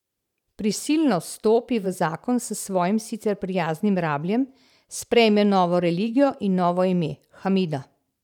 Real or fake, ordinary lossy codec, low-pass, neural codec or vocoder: fake; none; 19.8 kHz; vocoder, 44.1 kHz, 128 mel bands every 512 samples, BigVGAN v2